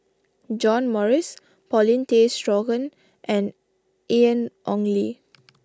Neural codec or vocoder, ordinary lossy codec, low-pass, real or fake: none; none; none; real